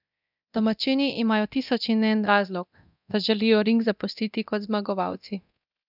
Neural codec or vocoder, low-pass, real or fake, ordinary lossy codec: codec, 24 kHz, 0.9 kbps, DualCodec; 5.4 kHz; fake; none